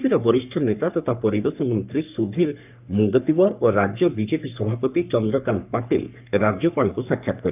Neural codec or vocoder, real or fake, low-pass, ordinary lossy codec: codec, 44.1 kHz, 3.4 kbps, Pupu-Codec; fake; 3.6 kHz; none